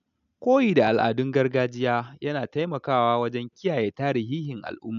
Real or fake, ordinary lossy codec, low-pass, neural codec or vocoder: real; none; 7.2 kHz; none